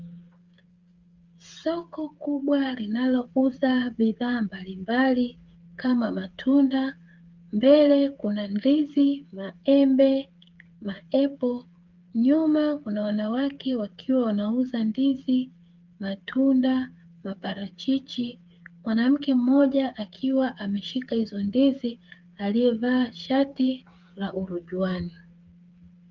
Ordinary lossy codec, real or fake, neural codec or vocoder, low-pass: Opus, 32 kbps; fake; codec, 16 kHz, 8 kbps, FreqCodec, smaller model; 7.2 kHz